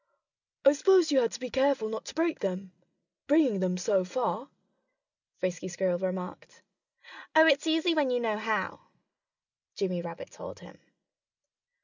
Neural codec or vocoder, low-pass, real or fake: none; 7.2 kHz; real